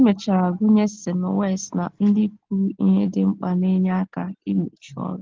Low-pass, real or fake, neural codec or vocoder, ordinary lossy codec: 7.2 kHz; real; none; Opus, 16 kbps